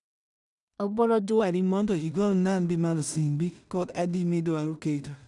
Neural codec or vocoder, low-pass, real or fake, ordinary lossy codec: codec, 16 kHz in and 24 kHz out, 0.4 kbps, LongCat-Audio-Codec, two codebook decoder; 10.8 kHz; fake; none